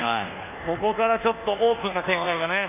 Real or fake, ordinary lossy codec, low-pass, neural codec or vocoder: fake; none; 3.6 kHz; codec, 24 kHz, 1.2 kbps, DualCodec